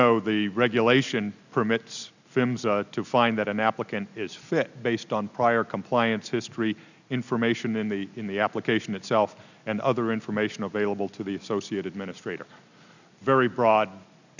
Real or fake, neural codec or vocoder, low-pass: real; none; 7.2 kHz